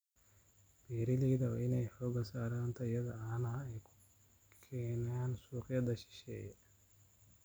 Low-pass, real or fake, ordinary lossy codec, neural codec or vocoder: none; real; none; none